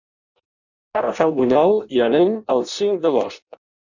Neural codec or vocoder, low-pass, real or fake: codec, 16 kHz in and 24 kHz out, 0.6 kbps, FireRedTTS-2 codec; 7.2 kHz; fake